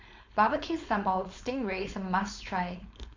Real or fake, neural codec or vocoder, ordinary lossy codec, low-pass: fake; codec, 16 kHz, 4.8 kbps, FACodec; MP3, 64 kbps; 7.2 kHz